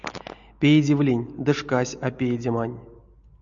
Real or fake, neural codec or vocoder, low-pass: real; none; 7.2 kHz